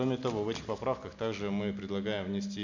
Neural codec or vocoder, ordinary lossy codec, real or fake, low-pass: none; none; real; 7.2 kHz